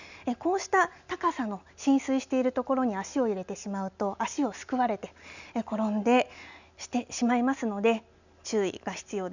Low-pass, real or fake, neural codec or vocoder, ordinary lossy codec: 7.2 kHz; real; none; none